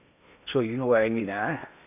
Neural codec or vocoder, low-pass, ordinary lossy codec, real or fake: codec, 16 kHz in and 24 kHz out, 0.8 kbps, FocalCodec, streaming, 65536 codes; 3.6 kHz; none; fake